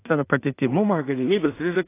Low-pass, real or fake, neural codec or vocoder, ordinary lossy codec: 3.6 kHz; fake; codec, 16 kHz in and 24 kHz out, 0.4 kbps, LongCat-Audio-Codec, two codebook decoder; AAC, 24 kbps